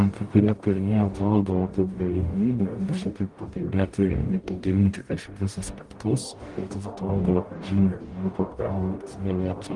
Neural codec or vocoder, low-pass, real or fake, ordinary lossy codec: codec, 44.1 kHz, 0.9 kbps, DAC; 10.8 kHz; fake; Opus, 24 kbps